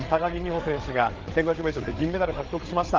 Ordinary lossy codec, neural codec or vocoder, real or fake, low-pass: Opus, 16 kbps; codec, 16 kHz, 8 kbps, FreqCodec, larger model; fake; 7.2 kHz